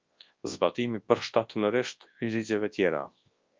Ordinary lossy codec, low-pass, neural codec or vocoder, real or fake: Opus, 32 kbps; 7.2 kHz; codec, 24 kHz, 0.9 kbps, WavTokenizer, large speech release; fake